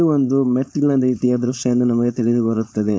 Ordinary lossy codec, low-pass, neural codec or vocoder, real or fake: none; none; codec, 16 kHz, 4.8 kbps, FACodec; fake